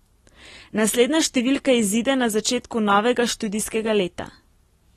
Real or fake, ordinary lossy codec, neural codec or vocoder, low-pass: real; AAC, 32 kbps; none; 19.8 kHz